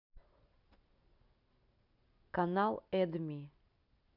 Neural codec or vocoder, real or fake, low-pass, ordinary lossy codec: none; real; 5.4 kHz; none